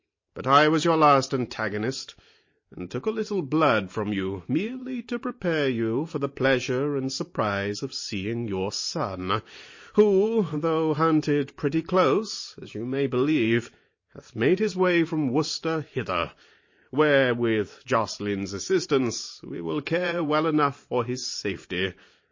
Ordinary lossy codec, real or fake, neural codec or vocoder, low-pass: MP3, 32 kbps; fake; vocoder, 44.1 kHz, 128 mel bands every 512 samples, BigVGAN v2; 7.2 kHz